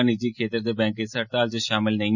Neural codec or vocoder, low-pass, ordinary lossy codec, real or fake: none; 7.2 kHz; none; real